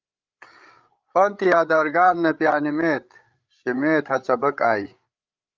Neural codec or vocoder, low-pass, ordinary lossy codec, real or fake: codec, 16 kHz, 8 kbps, FreqCodec, larger model; 7.2 kHz; Opus, 24 kbps; fake